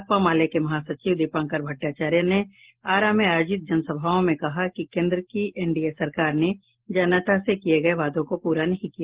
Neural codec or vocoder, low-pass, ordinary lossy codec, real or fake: none; 3.6 kHz; Opus, 16 kbps; real